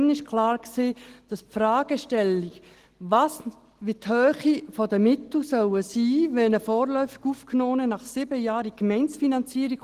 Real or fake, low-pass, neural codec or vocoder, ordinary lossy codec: real; 14.4 kHz; none; Opus, 16 kbps